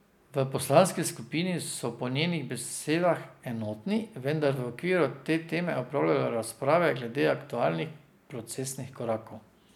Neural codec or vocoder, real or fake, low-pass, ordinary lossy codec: none; real; 19.8 kHz; none